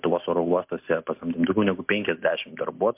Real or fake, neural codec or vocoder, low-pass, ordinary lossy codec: real; none; 3.6 kHz; MP3, 32 kbps